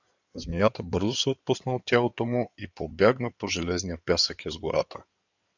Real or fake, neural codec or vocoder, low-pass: fake; codec, 16 kHz in and 24 kHz out, 2.2 kbps, FireRedTTS-2 codec; 7.2 kHz